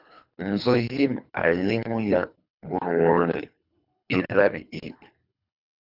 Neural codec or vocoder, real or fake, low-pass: codec, 24 kHz, 3 kbps, HILCodec; fake; 5.4 kHz